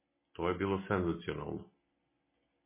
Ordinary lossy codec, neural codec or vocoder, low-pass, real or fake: MP3, 16 kbps; none; 3.6 kHz; real